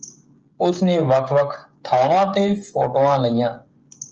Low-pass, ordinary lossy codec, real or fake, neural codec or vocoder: 7.2 kHz; Opus, 24 kbps; fake; codec, 16 kHz, 16 kbps, FreqCodec, smaller model